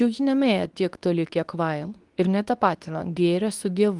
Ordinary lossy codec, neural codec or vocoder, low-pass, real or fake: Opus, 24 kbps; codec, 24 kHz, 0.9 kbps, WavTokenizer, medium speech release version 2; 10.8 kHz; fake